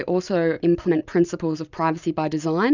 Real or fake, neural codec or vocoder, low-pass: real; none; 7.2 kHz